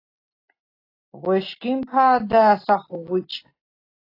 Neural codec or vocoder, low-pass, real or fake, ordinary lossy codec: none; 5.4 kHz; real; AAC, 24 kbps